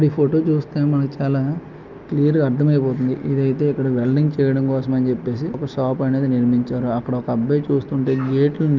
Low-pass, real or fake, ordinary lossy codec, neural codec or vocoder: 7.2 kHz; real; Opus, 24 kbps; none